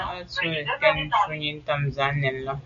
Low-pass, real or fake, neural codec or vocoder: 7.2 kHz; real; none